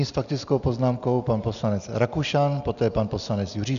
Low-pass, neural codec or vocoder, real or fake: 7.2 kHz; none; real